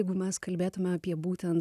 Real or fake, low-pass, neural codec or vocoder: real; 14.4 kHz; none